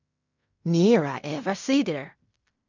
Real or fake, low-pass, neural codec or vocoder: fake; 7.2 kHz; codec, 16 kHz in and 24 kHz out, 0.4 kbps, LongCat-Audio-Codec, fine tuned four codebook decoder